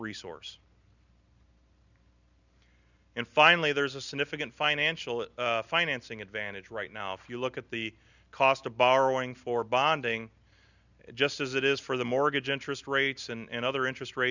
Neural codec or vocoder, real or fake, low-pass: none; real; 7.2 kHz